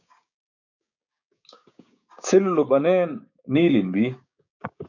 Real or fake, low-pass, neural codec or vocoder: fake; 7.2 kHz; codec, 16 kHz, 6 kbps, DAC